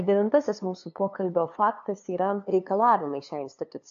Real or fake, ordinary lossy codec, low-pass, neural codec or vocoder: fake; AAC, 64 kbps; 7.2 kHz; codec, 16 kHz, 2 kbps, FunCodec, trained on LibriTTS, 25 frames a second